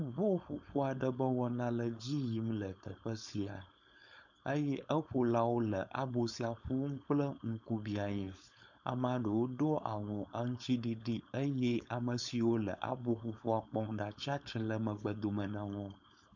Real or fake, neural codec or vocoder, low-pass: fake; codec, 16 kHz, 4.8 kbps, FACodec; 7.2 kHz